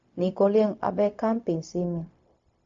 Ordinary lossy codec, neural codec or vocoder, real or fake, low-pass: MP3, 48 kbps; codec, 16 kHz, 0.4 kbps, LongCat-Audio-Codec; fake; 7.2 kHz